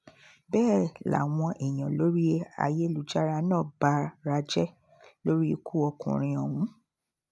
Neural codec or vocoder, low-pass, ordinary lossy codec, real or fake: none; none; none; real